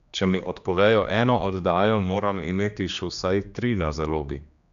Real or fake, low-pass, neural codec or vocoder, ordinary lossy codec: fake; 7.2 kHz; codec, 16 kHz, 2 kbps, X-Codec, HuBERT features, trained on general audio; none